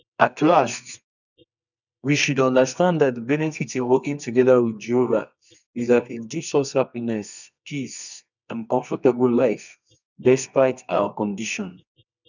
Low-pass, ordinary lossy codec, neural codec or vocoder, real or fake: 7.2 kHz; none; codec, 24 kHz, 0.9 kbps, WavTokenizer, medium music audio release; fake